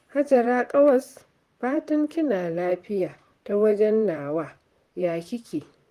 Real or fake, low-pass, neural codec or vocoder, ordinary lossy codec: fake; 14.4 kHz; vocoder, 44.1 kHz, 128 mel bands, Pupu-Vocoder; Opus, 24 kbps